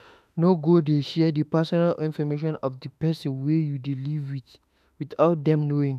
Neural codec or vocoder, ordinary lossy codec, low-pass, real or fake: autoencoder, 48 kHz, 32 numbers a frame, DAC-VAE, trained on Japanese speech; none; 14.4 kHz; fake